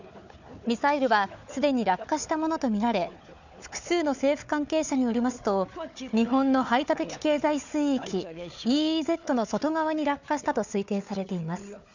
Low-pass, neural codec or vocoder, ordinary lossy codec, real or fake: 7.2 kHz; codec, 16 kHz, 4 kbps, FunCodec, trained on Chinese and English, 50 frames a second; none; fake